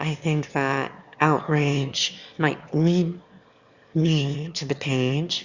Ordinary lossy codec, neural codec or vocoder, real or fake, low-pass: Opus, 64 kbps; autoencoder, 22.05 kHz, a latent of 192 numbers a frame, VITS, trained on one speaker; fake; 7.2 kHz